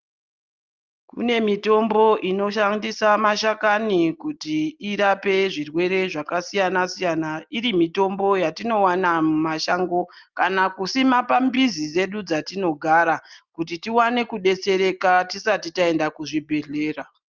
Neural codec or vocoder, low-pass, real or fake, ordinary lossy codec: none; 7.2 kHz; real; Opus, 32 kbps